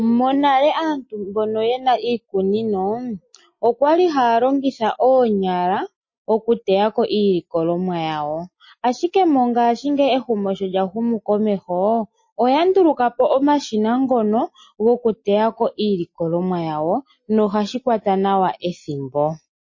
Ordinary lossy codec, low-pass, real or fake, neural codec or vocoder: MP3, 32 kbps; 7.2 kHz; real; none